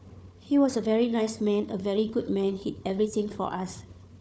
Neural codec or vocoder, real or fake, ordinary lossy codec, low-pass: codec, 16 kHz, 4 kbps, FunCodec, trained on Chinese and English, 50 frames a second; fake; none; none